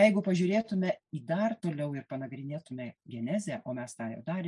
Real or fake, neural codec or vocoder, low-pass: real; none; 10.8 kHz